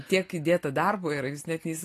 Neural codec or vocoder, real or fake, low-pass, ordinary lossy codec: none; real; 14.4 kHz; AAC, 48 kbps